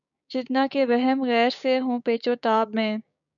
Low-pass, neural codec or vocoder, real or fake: 7.2 kHz; codec, 16 kHz, 6 kbps, DAC; fake